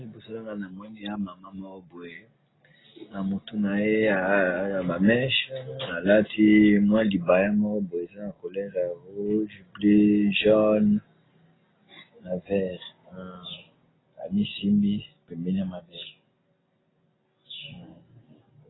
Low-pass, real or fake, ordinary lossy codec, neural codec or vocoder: 7.2 kHz; real; AAC, 16 kbps; none